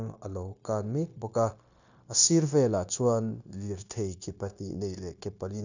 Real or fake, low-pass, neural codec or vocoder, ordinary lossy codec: fake; 7.2 kHz; codec, 16 kHz, 0.9 kbps, LongCat-Audio-Codec; none